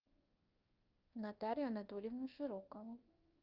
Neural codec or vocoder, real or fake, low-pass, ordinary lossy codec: codec, 24 kHz, 1.2 kbps, DualCodec; fake; 5.4 kHz; Opus, 32 kbps